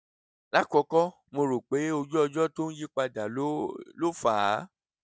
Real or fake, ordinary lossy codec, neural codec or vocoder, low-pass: real; none; none; none